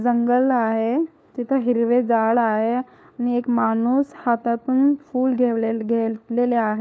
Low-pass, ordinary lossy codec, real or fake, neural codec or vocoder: none; none; fake; codec, 16 kHz, 4 kbps, FunCodec, trained on LibriTTS, 50 frames a second